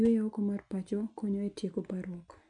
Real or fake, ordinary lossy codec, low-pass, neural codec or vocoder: real; none; 9.9 kHz; none